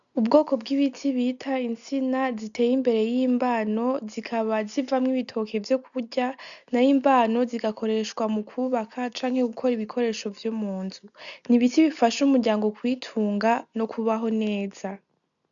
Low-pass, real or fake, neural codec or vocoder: 7.2 kHz; real; none